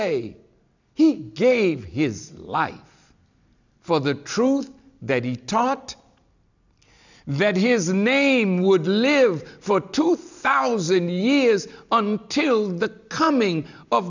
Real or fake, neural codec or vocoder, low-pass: real; none; 7.2 kHz